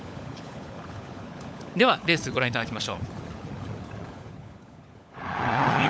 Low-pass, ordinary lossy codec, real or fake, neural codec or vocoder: none; none; fake; codec, 16 kHz, 16 kbps, FunCodec, trained on LibriTTS, 50 frames a second